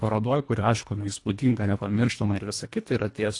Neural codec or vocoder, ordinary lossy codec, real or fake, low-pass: codec, 24 kHz, 1.5 kbps, HILCodec; AAC, 48 kbps; fake; 10.8 kHz